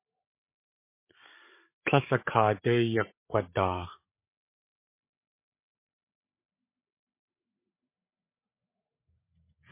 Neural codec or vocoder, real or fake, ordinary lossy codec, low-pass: codec, 16 kHz, 8 kbps, FreqCodec, larger model; fake; MP3, 24 kbps; 3.6 kHz